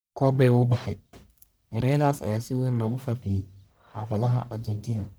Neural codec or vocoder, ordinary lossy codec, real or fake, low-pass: codec, 44.1 kHz, 1.7 kbps, Pupu-Codec; none; fake; none